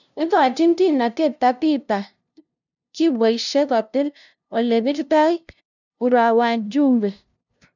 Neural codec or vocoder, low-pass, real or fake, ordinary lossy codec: codec, 16 kHz, 0.5 kbps, FunCodec, trained on LibriTTS, 25 frames a second; 7.2 kHz; fake; none